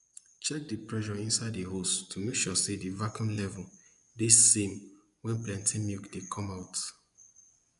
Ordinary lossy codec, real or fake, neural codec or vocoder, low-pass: MP3, 96 kbps; fake; vocoder, 24 kHz, 100 mel bands, Vocos; 10.8 kHz